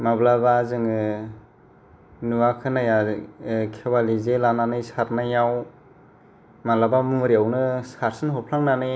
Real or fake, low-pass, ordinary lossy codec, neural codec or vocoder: real; none; none; none